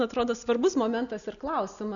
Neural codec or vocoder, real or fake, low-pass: none; real; 7.2 kHz